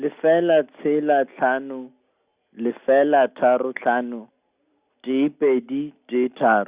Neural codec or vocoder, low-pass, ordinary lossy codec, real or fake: none; 3.6 kHz; Opus, 64 kbps; real